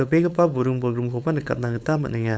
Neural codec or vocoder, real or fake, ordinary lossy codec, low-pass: codec, 16 kHz, 4.8 kbps, FACodec; fake; none; none